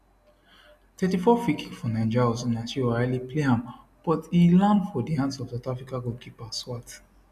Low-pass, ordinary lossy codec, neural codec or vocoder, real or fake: 14.4 kHz; none; none; real